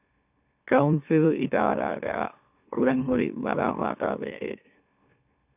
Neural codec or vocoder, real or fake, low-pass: autoencoder, 44.1 kHz, a latent of 192 numbers a frame, MeloTTS; fake; 3.6 kHz